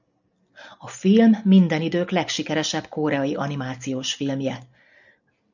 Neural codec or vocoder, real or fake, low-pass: none; real; 7.2 kHz